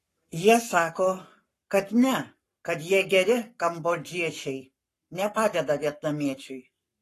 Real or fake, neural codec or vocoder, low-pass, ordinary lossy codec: fake; codec, 44.1 kHz, 7.8 kbps, Pupu-Codec; 14.4 kHz; AAC, 48 kbps